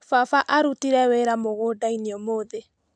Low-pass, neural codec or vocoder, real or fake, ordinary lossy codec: none; none; real; none